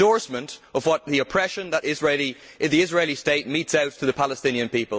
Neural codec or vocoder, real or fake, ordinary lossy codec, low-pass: none; real; none; none